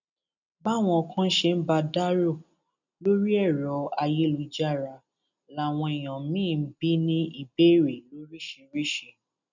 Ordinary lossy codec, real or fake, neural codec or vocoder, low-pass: none; real; none; 7.2 kHz